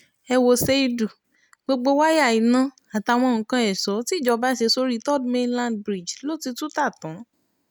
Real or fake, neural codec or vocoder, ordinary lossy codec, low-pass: real; none; none; none